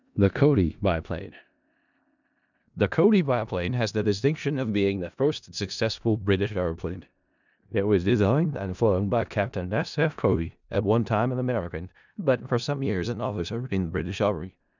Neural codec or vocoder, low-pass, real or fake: codec, 16 kHz in and 24 kHz out, 0.4 kbps, LongCat-Audio-Codec, four codebook decoder; 7.2 kHz; fake